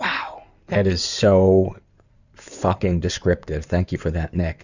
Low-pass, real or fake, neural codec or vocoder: 7.2 kHz; fake; codec, 16 kHz in and 24 kHz out, 2.2 kbps, FireRedTTS-2 codec